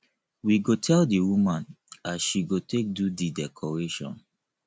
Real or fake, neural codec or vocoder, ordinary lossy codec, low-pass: real; none; none; none